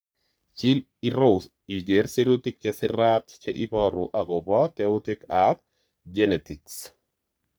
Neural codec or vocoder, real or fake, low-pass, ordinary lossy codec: codec, 44.1 kHz, 3.4 kbps, Pupu-Codec; fake; none; none